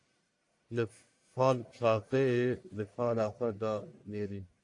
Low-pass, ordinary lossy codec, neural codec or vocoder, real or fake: 10.8 kHz; AAC, 48 kbps; codec, 44.1 kHz, 1.7 kbps, Pupu-Codec; fake